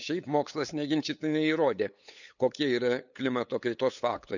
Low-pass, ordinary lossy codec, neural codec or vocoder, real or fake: 7.2 kHz; none; codec, 16 kHz, 8 kbps, FunCodec, trained on LibriTTS, 25 frames a second; fake